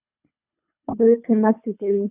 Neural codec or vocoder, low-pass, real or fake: codec, 24 kHz, 3 kbps, HILCodec; 3.6 kHz; fake